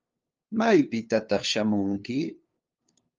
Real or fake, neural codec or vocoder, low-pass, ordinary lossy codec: fake; codec, 16 kHz, 2 kbps, FunCodec, trained on LibriTTS, 25 frames a second; 7.2 kHz; Opus, 24 kbps